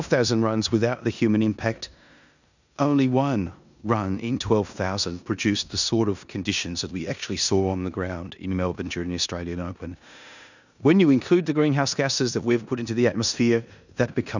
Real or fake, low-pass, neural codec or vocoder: fake; 7.2 kHz; codec, 16 kHz in and 24 kHz out, 0.9 kbps, LongCat-Audio-Codec, four codebook decoder